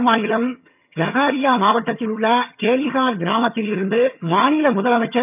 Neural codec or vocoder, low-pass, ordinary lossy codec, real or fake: vocoder, 22.05 kHz, 80 mel bands, HiFi-GAN; 3.6 kHz; none; fake